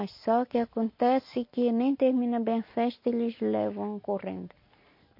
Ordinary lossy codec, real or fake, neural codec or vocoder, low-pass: MP3, 32 kbps; real; none; 5.4 kHz